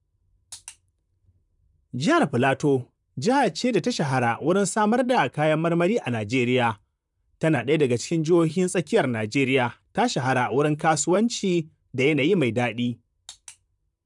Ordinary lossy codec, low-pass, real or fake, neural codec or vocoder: none; 10.8 kHz; fake; vocoder, 44.1 kHz, 128 mel bands, Pupu-Vocoder